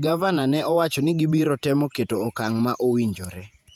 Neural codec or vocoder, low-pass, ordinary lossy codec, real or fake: vocoder, 48 kHz, 128 mel bands, Vocos; 19.8 kHz; none; fake